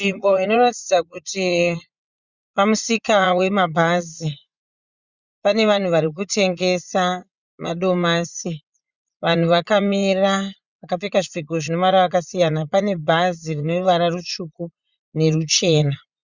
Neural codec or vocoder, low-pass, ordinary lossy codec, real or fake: vocoder, 44.1 kHz, 80 mel bands, Vocos; 7.2 kHz; Opus, 64 kbps; fake